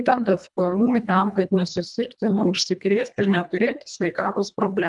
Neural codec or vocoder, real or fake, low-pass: codec, 24 kHz, 1.5 kbps, HILCodec; fake; 10.8 kHz